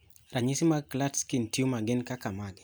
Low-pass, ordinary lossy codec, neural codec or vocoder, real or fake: none; none; none; real